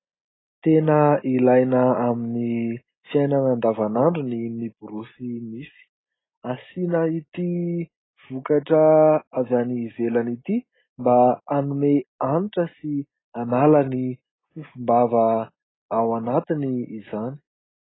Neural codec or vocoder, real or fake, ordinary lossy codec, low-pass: none; real; AAC, 16 kbps; 7.2 kHz